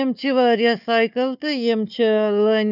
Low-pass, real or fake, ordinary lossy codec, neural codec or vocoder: 5.4 kHz; fake; none; codec, 16 kHz, 4 kbps, FunCodec, trained on Chinese and English, 50 frames a second